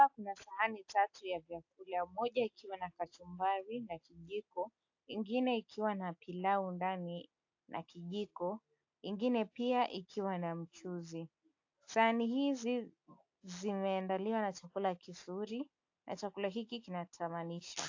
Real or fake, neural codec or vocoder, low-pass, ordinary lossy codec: real; none; 7.2 kHz; AAC, 48 kbps